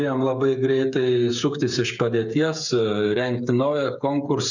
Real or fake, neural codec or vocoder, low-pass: fake; codec, 16 kHz, 8 kbps, FreqCodec, smaller model; 7.2 kHz